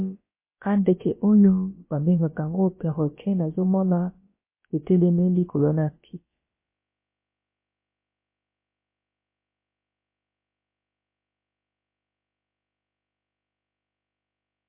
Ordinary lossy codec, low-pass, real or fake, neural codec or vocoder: MP3, 24 kbps; 3.6 kHz; fake; codec, 16 kHz, about 1 kbps, DyCAST, with the encoder's durations